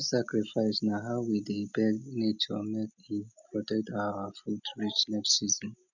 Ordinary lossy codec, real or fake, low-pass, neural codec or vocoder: none; real; 7.2 kHz; none